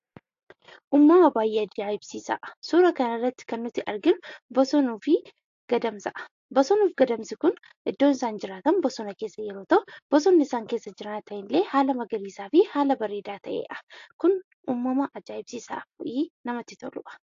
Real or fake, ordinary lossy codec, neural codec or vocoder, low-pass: real; AAC, 48 kbps; none; 7.2 kHz